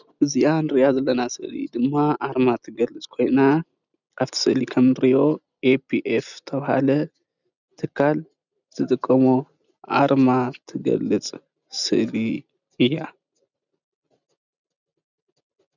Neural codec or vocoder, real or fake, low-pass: none; real; 7.2 kHz